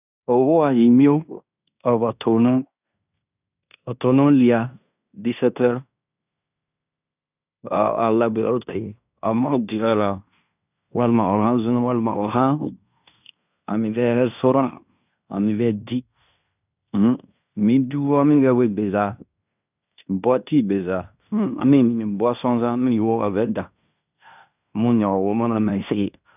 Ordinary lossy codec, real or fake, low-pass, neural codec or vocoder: none; fake; 3.6 kHz; codec, 16 kHz in and 24 kHz out, 0.9 kbps, LongCat-Audio-Codec, fine tuned four codebook decoder